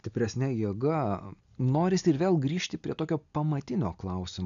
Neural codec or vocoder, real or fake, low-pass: none; real; 7.2 kHz